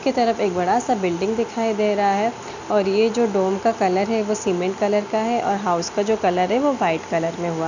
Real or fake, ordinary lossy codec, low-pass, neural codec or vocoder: real; none; 7.2 kHz; none